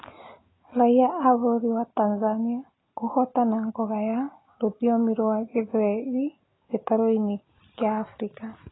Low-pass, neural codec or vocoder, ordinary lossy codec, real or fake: 7.2 kHz; none; AAC, 16 kbps; real